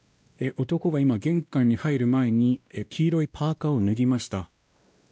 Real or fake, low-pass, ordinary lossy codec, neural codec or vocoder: fake; none; none; codec, 16 kHz, 1 kbps, X-Codec, WavLM features, trained on Multilingual LibriSpeech